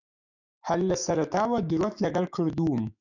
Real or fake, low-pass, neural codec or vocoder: fake; 7.2 kHz; autoencoder, 48 kHz, 128 numbers a frame, DAC-VAE, trained on Japanese speech